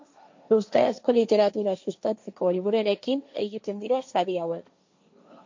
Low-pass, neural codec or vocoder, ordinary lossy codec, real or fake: 7.2 kHz; codec, 16 kHz, 1.1 kbps, Voila-Tokenizer; MP3, 48 kbps; fake